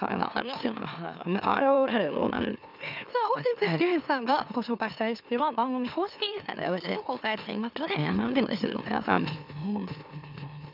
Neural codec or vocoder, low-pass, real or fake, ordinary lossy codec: autoencoder, 44.1 kHz, a latent of 192 numbers a frame, MeloTTS; 5.4 kHz; fake; none